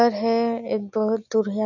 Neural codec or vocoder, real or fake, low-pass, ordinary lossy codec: none; real; 7.2 kHz; none